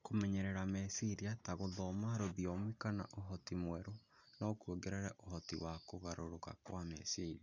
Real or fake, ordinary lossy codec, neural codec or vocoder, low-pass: real; none; none; 7.2 kHz